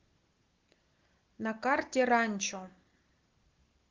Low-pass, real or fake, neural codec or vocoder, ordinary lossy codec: 7.2 kHz; real; none; Opus, 16 kbps